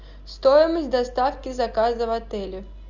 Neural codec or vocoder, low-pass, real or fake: none; 7.2 kHz; real